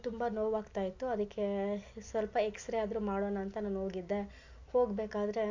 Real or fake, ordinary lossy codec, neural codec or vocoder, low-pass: real; MP3, 48 kbps; none; 7.2 kHz